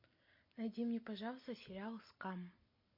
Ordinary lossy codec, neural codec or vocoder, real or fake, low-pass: MP3, 32 kbps; none; real; 5.4 kHz